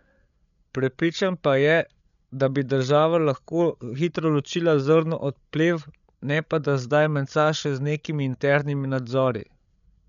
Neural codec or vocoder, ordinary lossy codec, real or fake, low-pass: codec, 16 kHz, 8 kbps, FreqCodec, larger model; none; fake; 7.2 kHz